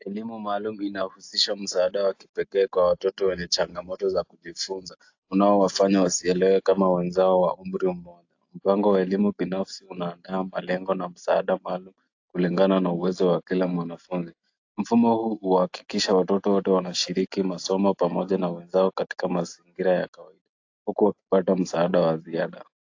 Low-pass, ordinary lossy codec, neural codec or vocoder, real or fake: 7.2 kHz; AAC, 48 kbps; none; real